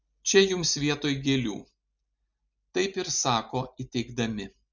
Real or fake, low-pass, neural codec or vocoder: real; 7.2 kHz; none